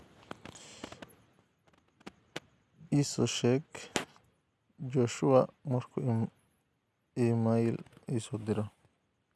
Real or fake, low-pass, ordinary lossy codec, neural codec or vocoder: real; none; none; none